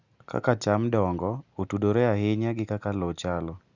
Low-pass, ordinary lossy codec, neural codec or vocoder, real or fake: 7.2 kHz; Opus, 64 kbps; none; real